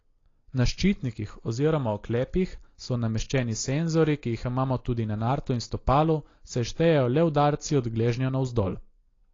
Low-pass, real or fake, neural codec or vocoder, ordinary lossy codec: 7.2 kHz; real; none; AAC, 32 kbps